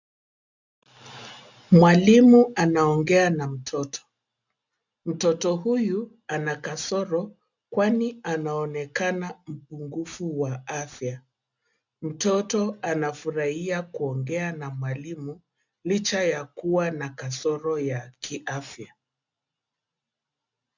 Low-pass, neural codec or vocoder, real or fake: 7.2 kHz; none; real